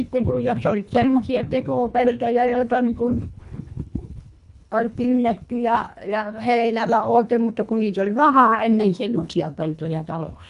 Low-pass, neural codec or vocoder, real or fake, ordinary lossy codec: 10.8 kHz; codec, 24 kHz, 1.5 kbps, HILCodec; fake; none